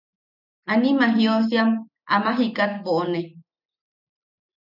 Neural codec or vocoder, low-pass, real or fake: none; 5.4 kHz; real